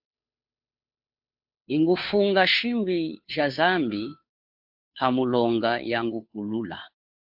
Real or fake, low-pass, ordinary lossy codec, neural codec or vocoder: fake; 5.4 kHz; AAC, 48 kbps; codec, 16 kHz, 2 kbps, FunCodec, trained on Chinese and English, 25 frames a second